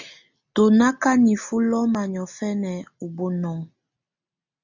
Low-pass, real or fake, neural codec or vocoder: 7.2 kHz; real; none